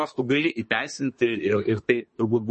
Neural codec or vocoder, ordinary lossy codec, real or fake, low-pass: codec, 24 kHz, 1 kbps, SNAC; MP3, 32 kbps; fake; 10.8 kHz